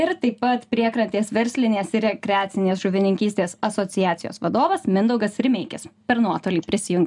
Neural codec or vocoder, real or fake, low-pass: none; real; 10.8 kHz